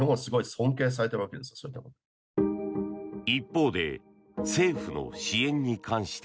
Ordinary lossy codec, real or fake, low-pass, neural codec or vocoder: none; real; none; none